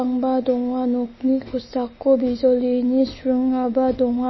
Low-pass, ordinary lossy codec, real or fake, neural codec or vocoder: 7.2 kHz; MP3, 24 kbps; real; none